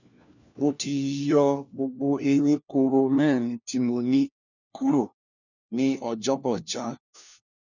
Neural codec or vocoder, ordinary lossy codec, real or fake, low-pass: codec, 16 kHz, 1 kbps, FunCodec, trained on LibriTTS, 50 frames a second; none; fake; 7.2 kHz